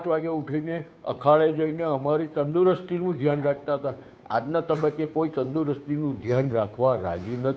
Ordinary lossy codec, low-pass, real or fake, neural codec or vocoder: none; none; fake; codec, 16 kHz, 2 kbps, FunCodec, trained on Chinese and English, 25 frames a second